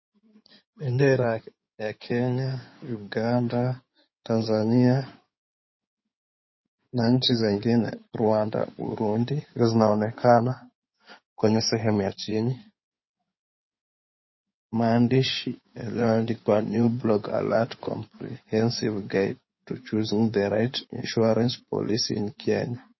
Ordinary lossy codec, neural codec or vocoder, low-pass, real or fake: MP3, 24 kbps; codec, 16 kHz in and 24 kHz out, 2.2 kbps, FireRedTTS-2 codec; 7.2 kHz; fake